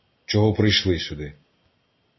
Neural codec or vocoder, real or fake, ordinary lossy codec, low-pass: none; real; MP3, 24 kbps; 7.2 kHz